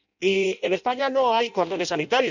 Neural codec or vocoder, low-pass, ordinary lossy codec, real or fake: codec, 16 kHz in and 24 kHz out, 0.6 kbps, FireRedTTS-2 codec; 7.2 kHz; none; fake